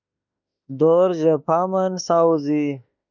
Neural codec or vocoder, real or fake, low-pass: autoencoder, 48 kHz, 32 numbers a frame, DAC-VAE, trained on Japanese speech; fake; 7.2 kHz